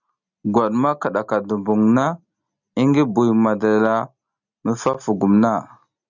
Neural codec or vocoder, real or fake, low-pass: none; real; 7.2 kHz